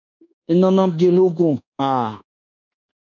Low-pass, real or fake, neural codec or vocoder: 7.2 kHz; fake; codec, 24 kHz, 1.2 kbps, DualCodec